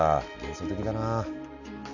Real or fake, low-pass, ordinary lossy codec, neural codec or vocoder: real; 7.2 kHz; none; none